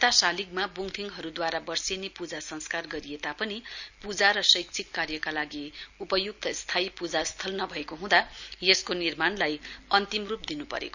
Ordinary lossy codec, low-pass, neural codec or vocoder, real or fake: none; 7.2 kHz; none; real